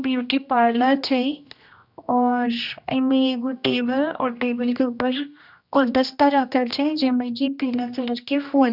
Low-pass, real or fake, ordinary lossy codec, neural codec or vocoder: 5.4 kHz; fake; none; codec, 16 kHz, 1 kbps, X-Codec, HuBERT features, trained on general audio